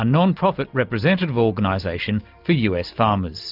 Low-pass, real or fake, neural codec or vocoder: 5.4 kHz; real; none